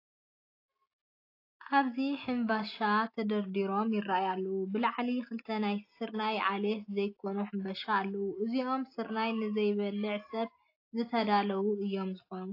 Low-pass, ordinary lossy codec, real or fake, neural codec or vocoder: 5.4 kHz; AAC, 32 kbps; real; none